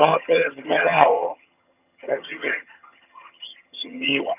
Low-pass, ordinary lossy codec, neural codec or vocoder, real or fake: 3.6 kHz; none; vocoder, 22.05 kHz, 80 mel bands, HiFi-GAN; fake